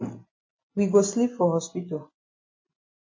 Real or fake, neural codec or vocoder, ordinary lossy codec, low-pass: real; none; MP3, 32 kbps; 7.2 kHz